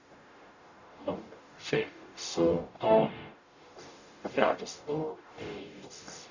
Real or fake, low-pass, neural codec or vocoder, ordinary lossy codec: fake; 7.2 kHz; codec, 44.1 kHz, 0.9 kbps, DAC; none